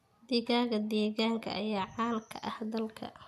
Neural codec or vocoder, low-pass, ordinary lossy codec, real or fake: none; 14.4 kHz; none; real